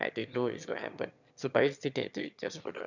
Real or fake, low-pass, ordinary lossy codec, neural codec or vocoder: fake; 7.2 kHz; none; autoencoder, 22.05 kHz, a latent of 192 numbers a frame, VITS, trained on one speaker